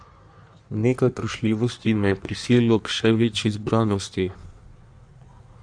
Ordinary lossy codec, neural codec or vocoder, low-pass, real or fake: none; codec, 16 kHz in and 24 kHz out, 1.1 kbps, FireRedTTS-2 codec; 9.9 kHz; fake